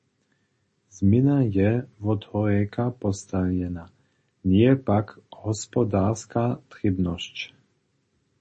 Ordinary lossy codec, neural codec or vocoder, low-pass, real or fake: MP3, 32 kbps; none; 10.8 kHz; real